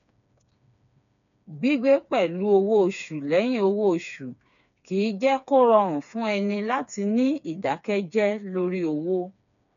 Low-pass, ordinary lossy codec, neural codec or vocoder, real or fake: 7.2 kHz; MP3, 96 kbps; codec, 16 kHz, 4 kbps, FreqCodec, smaller model; fake